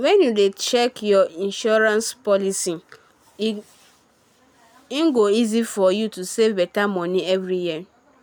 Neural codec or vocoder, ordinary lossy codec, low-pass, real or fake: none; none; none; real